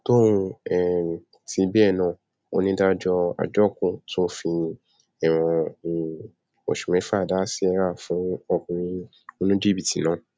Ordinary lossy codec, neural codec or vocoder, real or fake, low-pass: none; none; real; none